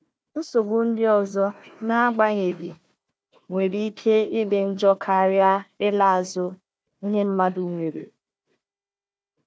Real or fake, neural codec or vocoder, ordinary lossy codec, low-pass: fake; codec, 16 kHz, 1 kbps, FunCodec, trained on Chinese and English, 50 frames a second; none; none